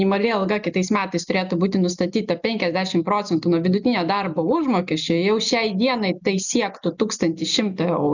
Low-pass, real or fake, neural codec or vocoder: 7.2 kHz; real; none